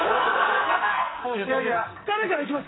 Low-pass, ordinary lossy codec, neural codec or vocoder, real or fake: 7.2 kHz; AAC, 16 kbps; codec, 44.1 kHz, 2.6 kbps, SNAC; fake